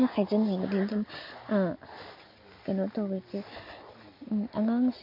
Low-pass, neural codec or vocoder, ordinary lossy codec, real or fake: 5.4 kHz; none; MP3, 32 kbps; real